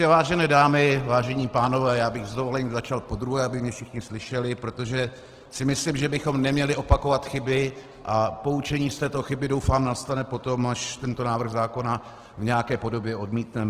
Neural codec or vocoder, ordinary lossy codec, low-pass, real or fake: none; Opus, 16 kbps; 14.4 kHz; real